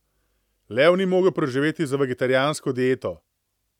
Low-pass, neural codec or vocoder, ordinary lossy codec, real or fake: 19.8 kHz; none; none; real